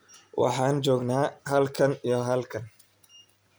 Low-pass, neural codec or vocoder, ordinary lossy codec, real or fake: none; none; none; real